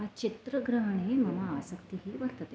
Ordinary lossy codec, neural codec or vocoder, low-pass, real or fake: none; none; none; real